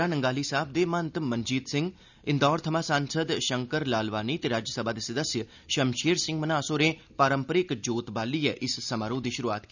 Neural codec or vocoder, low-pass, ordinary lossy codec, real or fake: none; 7.2 kHz; none; real